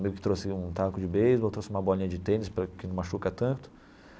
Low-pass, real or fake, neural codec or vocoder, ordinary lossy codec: none; real; none; none